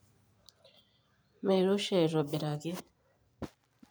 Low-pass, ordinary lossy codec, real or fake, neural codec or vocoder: none; none; fake; vocoder, 44.1 kHz, 128 mel bands every 512 samples, BigVGAN v2